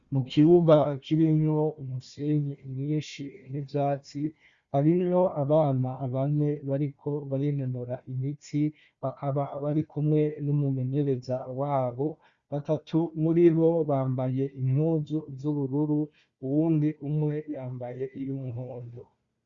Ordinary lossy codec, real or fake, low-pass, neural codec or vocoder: Opus, 64 kbps; fake; 7.2 kHz; codec, 16 kHz, 1 kbps, FunCodec, trained on Chinese and English, 50 frames a second